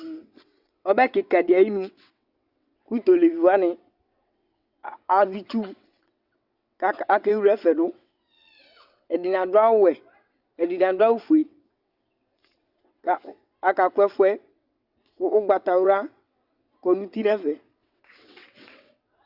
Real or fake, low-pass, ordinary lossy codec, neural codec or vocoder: fake; 5.4 kHz; Opus, 64 kbps; vocoder, 22.05 kHz, 80 mel bands, WaveNeXt